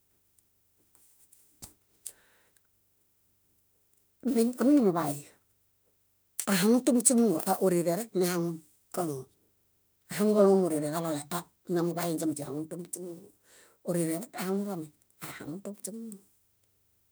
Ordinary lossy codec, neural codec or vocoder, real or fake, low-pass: none; autoencoder, 48 kHz, 32 numbers a frame, DAC-VAE, trained on Japanese speech; fake; none